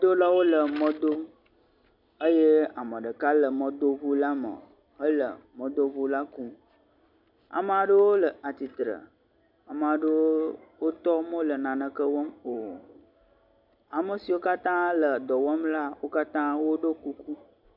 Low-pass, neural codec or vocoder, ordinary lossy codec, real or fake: 5.4 kHz; none; MP3, 48 kbps; real